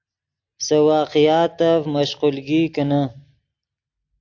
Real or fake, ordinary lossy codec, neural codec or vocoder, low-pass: real; AAC, 48 kbps; none; 7.2 kHz